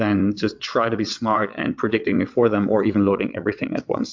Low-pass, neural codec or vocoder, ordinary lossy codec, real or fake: 7.2 kHz; vocoder, 22.05 kHz, 80 mel bands, WaveNeXt; MP3, 64 kbps; fake